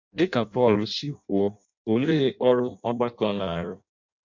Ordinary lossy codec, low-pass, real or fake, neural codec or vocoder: MP3, 64 kbps; 7.2 kHz; fake; codec, 16 kHz in and 24 kHz out, 0.6 kbps, FireRedTTS-2 codec